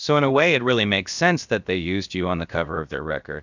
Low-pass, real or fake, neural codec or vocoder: 7.2 kHz; fake; codec, 16 kHz, about 1 kbps, DyCAST, with the encoder's durations